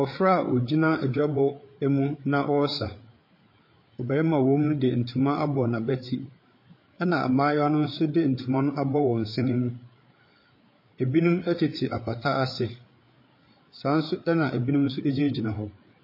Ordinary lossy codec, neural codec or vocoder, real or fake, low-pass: MP3, 24 kbps; codec, 16 kHz, 8 kbps, FreqCodec, larger model; fake; 5.4 kHz